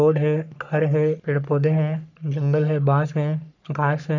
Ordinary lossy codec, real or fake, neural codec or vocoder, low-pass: none; fake; codec, 44.1 kHz, 3.4 kbps, Pupu-Codec; 7.2 kHz